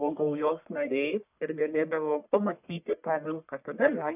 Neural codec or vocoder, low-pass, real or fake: codec, 44.1 kHz, 1.7 kbps, Pupu-Codec; 3.6 kHz; fake